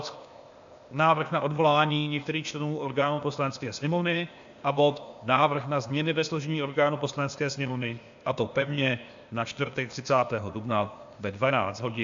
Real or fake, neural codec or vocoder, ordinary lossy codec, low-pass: fake; codec, 16 kHz, 0.8 kbps, ZipCodec; AAC, 64 kbps; 7.2 kHz